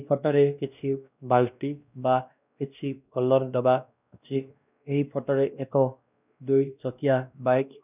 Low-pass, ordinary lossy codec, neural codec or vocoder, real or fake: 3.6 kHz; none; codec, 16 kHz, 1 kbps, X-Codec, WavLM features, trained on Multilingual LibriSpeech; fake